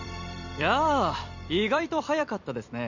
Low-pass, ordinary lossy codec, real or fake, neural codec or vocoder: 7.2 kHz; none; fake; vocoder, 44.1 kHz, 128 mel bands every 256 samples, BigVGAN v2